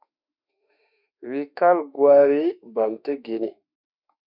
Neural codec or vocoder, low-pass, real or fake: autoencoder, 48 kHz, 32 numbers a frame, DAC-VAE, trained on Japanese speech; 5.4 kHz; fake